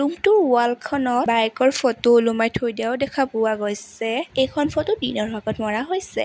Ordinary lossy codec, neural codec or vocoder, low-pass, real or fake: none; none; none; real